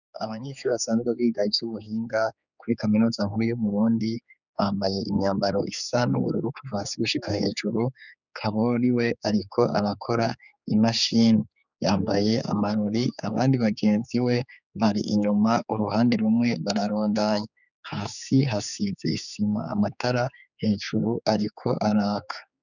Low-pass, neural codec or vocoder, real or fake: 7.2 kHz; codec, 16 kHz, 4 kbps, X-Codec, HuBERT features, trained on general audio; fake